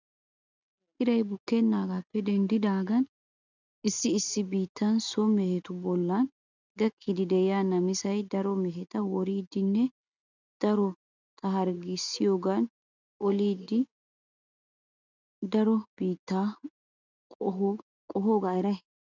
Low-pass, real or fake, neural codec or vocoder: 7.2 kHz; real; none